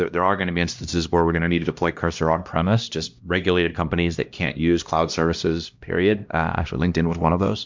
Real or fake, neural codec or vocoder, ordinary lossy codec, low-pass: fake; codec, 16 kHz, 1 kbps, X-Codec, HuBERT features, trained on LibriSpeech; MP3, 64 kbps; 7.2 kHz